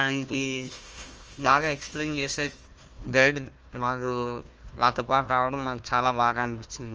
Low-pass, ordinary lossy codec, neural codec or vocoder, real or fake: 7.2 kHz; Opus, 24 kbps; codec, 16 kHz, 1 kbps, FunCodec, trained on Chinese and English, 50 frames a second; fake